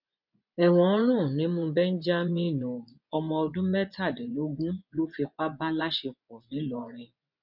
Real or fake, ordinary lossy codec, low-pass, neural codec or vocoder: fake; none; 5.4 kHz; vocoder, 24 kHz, 100 mel bands, Vocos